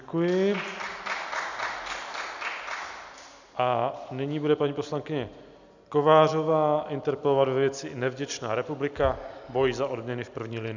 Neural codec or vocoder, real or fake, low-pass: none; real; 7.2 kHz